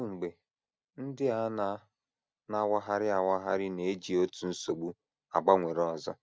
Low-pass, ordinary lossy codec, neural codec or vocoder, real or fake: none; none; none; real